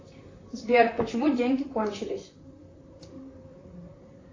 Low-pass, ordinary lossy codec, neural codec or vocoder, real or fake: 7.2 kHz; AAC, 32 kbps; vocoder, 44.1 kHz, 128 mel bands, Pupu-Vocoder; fake